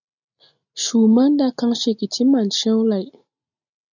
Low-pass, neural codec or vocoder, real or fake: 7.2 kHz; none; real